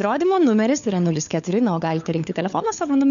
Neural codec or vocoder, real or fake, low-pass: codec, 16 kHz, 4 kbps, FunCodec, trained on LibriTTS, 50 frames a second; fake; 7.2 kHz